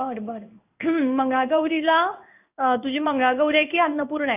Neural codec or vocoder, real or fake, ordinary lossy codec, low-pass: codec, 16 kHz in and 24 kHz out, 1 kbps, XY-Tokenizer; fake; none; 3.6 kHz